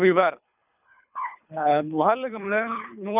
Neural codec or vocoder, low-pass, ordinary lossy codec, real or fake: codec, 24 kHz, 3 kbps, HILCodec; 3.6 kHz; none; fake